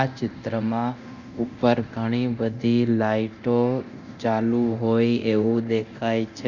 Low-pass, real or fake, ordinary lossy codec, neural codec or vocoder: 7.2 kHz; fake; Opus, 64 kbps; codec, 24 kHz, 0.9 kbps, DualCodec